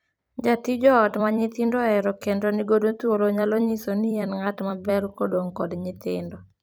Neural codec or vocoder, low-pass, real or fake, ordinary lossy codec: vocoder, 44.1 kHz, 128 mel bands every 256 samples, BigVGAN v2; none; fake; none